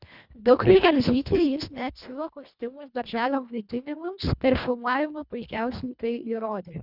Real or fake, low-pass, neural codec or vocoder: fake; 5.4 kHz; codec, 24 kHz, 1.5 kbps, HILCodec